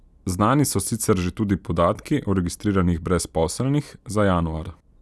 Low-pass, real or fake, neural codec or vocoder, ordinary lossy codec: none; real; none; none